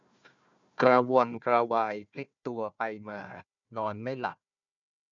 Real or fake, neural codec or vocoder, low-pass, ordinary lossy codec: fake; codec, 16 kHz, 1 kbps, FunCodec, trained on Chinese and English, 50 frames a second; 7.2 kHz; none